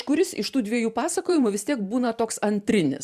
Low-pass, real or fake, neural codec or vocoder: 14.4 kHz; fake; vocoder, 44.1 kHz, 128 mel bands every 512 samples, BigVGAN v2